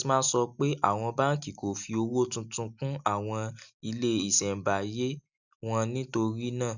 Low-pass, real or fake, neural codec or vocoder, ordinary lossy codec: 7.2 kHz; real; none; none